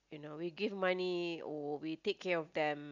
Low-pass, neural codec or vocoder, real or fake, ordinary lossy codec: 7.2 kHz; none; real; none